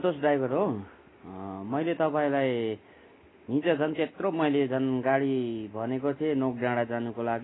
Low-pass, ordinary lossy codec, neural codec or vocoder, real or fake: 7.2 kHz; AAC, 16 kbps; none; real